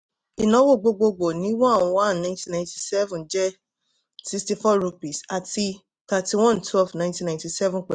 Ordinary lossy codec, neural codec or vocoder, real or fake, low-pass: none; none; real; 9.9 kHz